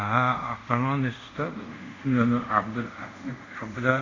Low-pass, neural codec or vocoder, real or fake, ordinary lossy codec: 7.2 kHz; codec, 24 kHz, 0.5 kbps, DualCodec; fake; MP3, 48 kbps